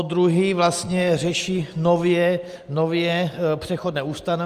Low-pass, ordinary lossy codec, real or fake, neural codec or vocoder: 14.4 kHz; Opus, 32 kbps; real; none